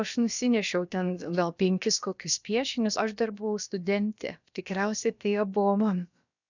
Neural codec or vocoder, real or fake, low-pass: codec, 16 kHz, about 1 kbps, DyCAST, with the encoder's durations; fake; 7.2 kHz